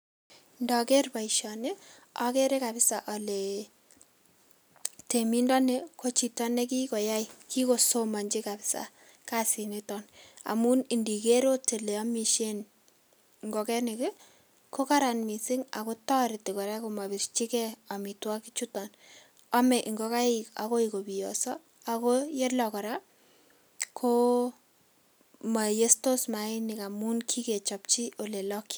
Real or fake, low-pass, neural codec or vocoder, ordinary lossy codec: real; none; none; none